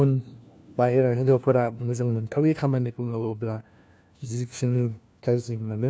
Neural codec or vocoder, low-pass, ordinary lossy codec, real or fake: codec, 16 kHz, 1 kbps, FunCodec, trained on LibriTTS, 50 frames a second; none; none; fake